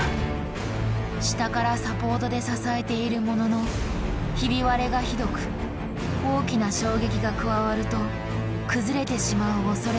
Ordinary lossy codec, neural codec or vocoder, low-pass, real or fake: none; none; none; real